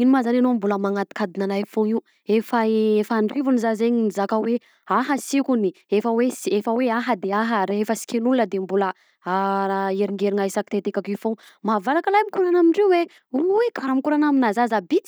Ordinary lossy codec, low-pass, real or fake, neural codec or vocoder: none; none; real; none